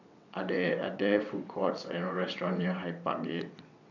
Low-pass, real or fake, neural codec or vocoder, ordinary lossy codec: 7.2 kHz; fake; vocoder, 22.05 kHz, 80 mel bands, WaveNeXt; none